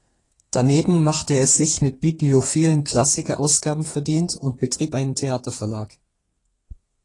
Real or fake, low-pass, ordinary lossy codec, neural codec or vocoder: fake; 10.8 kHz; AAC, 32 kbps; codec, 32 kHz, 1.9 kbps, SNAC